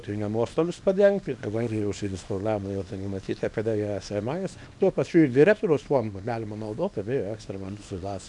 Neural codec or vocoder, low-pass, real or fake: codec, 24 kHz, 0.9 kbps, WavTokenizer, small release; 10.8 kHz; fake